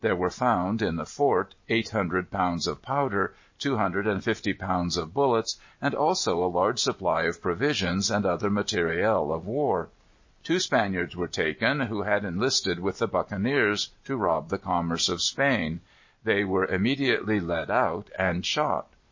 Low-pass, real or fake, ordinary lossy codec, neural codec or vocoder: 7.2 kHz; real; MP3, 32 kbps; none